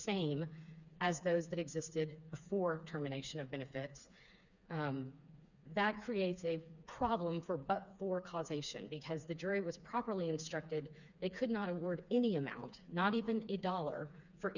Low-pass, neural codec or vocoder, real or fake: 7.2 kHz; codec, 16 kHz, 4 kbps, FreqCodec, smaller model; fake